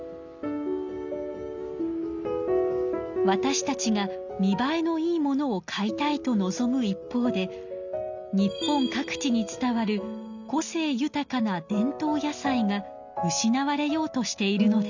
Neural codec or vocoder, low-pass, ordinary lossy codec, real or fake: none; 7.2 kHz; none; real